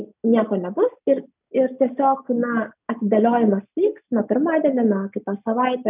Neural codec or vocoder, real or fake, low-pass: none; real; 3.6 kHz